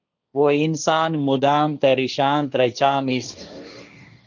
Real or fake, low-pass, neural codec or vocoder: fake; 7.2 kHz; codec, 16 kHz, 1.1 kbps, Voila-Tokenizer